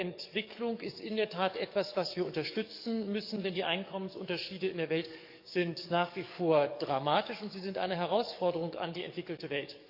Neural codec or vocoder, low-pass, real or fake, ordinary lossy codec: codec, 44.1 kHz, 7.8 kbps, DAC; 5.4 kHz; fake; none